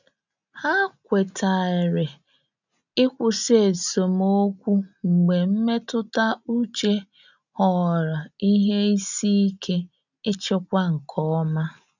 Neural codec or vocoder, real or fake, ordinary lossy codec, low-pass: none; real; none; 7.2 kHz